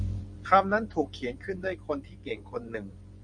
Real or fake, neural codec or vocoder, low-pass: fake; vocoder, 24 kHz, 100 mel bands, Vocos; 9.9 kHz